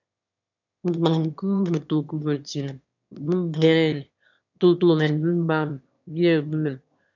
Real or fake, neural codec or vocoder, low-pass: fake; autoencoder, 22.05 kHz, a latent of 192 numbers a frame, VITS, trained on one speaker; 7.2 kHz